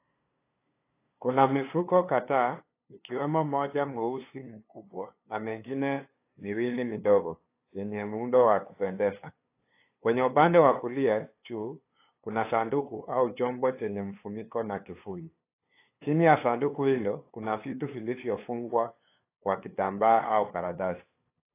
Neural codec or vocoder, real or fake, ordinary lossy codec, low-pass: codec, 16 kHz, 2 kbps, FunCodec, trained on LibriTTS, 25 frames a second; fake; AAC, 24 kbps; 3.6 kHz